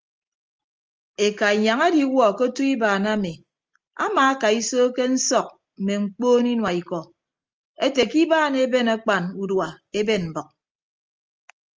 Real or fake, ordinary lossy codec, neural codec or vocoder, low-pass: real; Opus, 24 kbps; none; 7.2 kHz